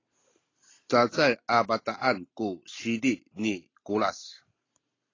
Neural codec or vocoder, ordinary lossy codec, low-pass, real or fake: none; AAC, 32 kbps; 7.2 kHz; real